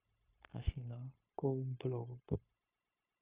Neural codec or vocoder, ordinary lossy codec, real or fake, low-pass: codec, 16 kHz, 0.9 kbps, LongCat-Audio-Codec; Opus, 64 kbps; fake; 3.6 kHz